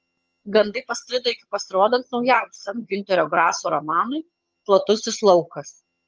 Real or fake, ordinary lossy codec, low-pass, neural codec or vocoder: fake; Opus, 24 kbps; 7.2 kHz; vocoder, 22.05 kHz, 80 mel bands, HiFi-GAN